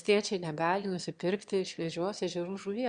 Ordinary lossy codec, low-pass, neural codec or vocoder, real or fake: Opus, 64 kbps; 9.9 kHz; autoencoder, 22.05 kHz, a latent of 192 numbers a frame, VITS, trained on one speaker; fake